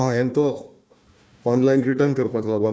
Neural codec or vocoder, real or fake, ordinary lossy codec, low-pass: codec, 16 kHz, 1 kbps, FunCodec, trained on Chinese and English, 50 frames a second; fake; none; none